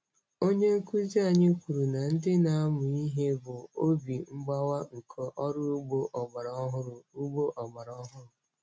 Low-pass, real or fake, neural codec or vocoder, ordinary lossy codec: none; real; none; none